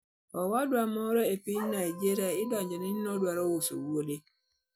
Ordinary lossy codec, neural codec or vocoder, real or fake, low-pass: none; none; real; none